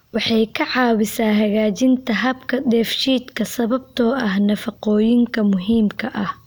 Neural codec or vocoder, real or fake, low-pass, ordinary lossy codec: none; real; none; none